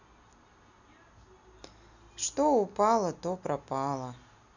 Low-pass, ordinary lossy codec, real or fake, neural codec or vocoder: 7.2 kHz; none; real; none